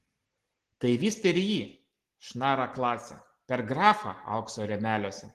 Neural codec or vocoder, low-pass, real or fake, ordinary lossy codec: none; 14.4 kHz; real; Opus, 16 kbps